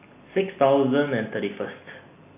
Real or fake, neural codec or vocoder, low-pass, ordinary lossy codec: real; none; 3.6 kHz; none